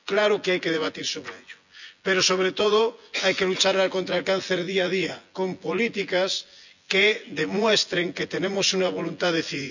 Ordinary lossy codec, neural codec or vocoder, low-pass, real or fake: none; vocoder, 24 kHz, 100 mel bands, Vocos; 7.2 kHz; fake